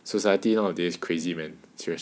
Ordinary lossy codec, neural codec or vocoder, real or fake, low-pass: none; none; real; none